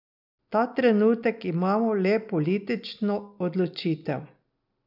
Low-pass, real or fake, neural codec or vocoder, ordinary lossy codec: 5.4 kHz; real; none; none